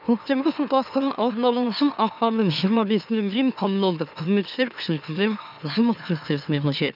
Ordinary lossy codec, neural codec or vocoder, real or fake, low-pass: none; autoencoder, 44.1 kHz, a latent of 192 numbers a frame, MeloTTS; fake; 5.4 kHz